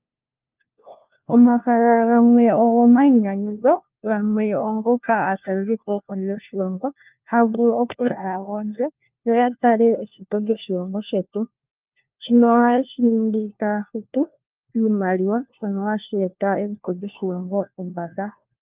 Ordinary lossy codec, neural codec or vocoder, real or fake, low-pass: Opus, 24 kbps; codec, 16 kHz, 1 kbps, FunCodec, trained on LibriTTS, 50 frames a second; fake; 3.6 kHz